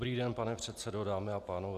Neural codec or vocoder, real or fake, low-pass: none; real; 14.4 kHz